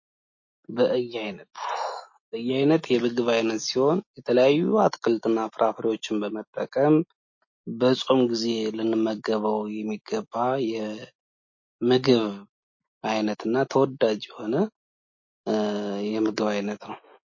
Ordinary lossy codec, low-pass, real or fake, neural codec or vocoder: MP3, 32 kbps; 7.2 kHz; real; none